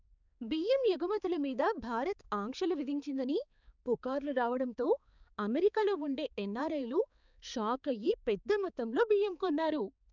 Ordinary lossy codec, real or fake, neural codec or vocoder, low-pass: none; fake; codec, 16 kHz, 4 kbps, X-Codec, HuBERT features, trained on balanced general audio; 7.2 kHz